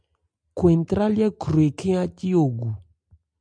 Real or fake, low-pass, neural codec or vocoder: real; 9.9 kHz; none